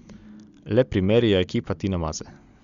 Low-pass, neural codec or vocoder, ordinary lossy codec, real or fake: 7.2 kHz; none; Opus, 64 kbps; real